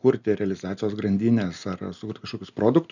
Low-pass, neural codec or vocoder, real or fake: 7.2 kHz; none; real